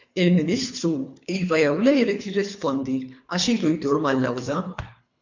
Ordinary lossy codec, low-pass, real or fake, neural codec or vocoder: MP3, 48 kbps; 7.2 kHz; fake; codec, 24 kHz, 3 kbps, HILCodec